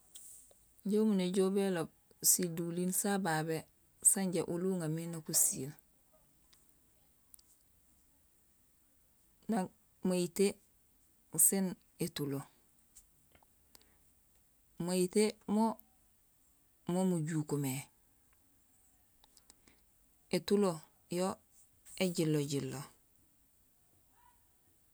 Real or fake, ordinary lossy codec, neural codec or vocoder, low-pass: real; none; none; none